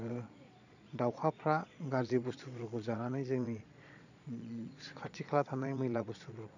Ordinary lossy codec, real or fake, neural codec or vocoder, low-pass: none; fake; vocoder, 22.05 kHz, 80 mel bands, Vocos; 7.2 kHz